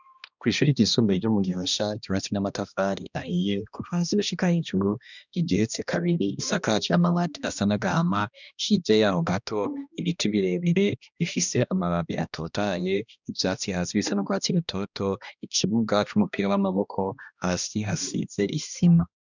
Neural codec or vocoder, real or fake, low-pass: codec, 16 kHz, 1 kbps, X-Codec, HuBERT features, trained on balanced general audio; fake; 7.2 kHz